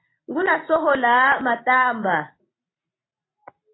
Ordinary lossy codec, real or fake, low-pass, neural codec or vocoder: AAC, 16 kbps; real; 7.2 kHz; none